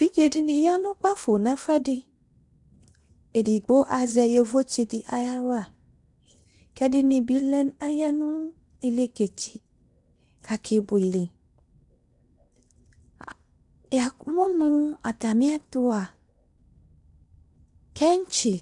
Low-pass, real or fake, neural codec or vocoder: 10.8 kHz; fake; codec, 16 kHz in and 24 kHz out, 0.8 kbps, FocalCodec, streaming, 65536 codes